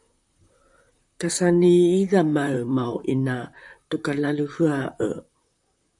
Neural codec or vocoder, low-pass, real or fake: vocoder, 44.1 kHz, 128 mel bands, Pupu-Vocoder; 10.8 kHz; fake